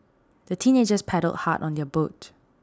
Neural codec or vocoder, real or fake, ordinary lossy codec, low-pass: none; real; none; none